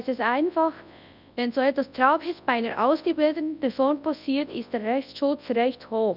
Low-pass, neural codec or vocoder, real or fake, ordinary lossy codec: 5.4 kHz; codec, 24 kHz, 0.9 kbps, WavTokenizer, large speech release; fake; none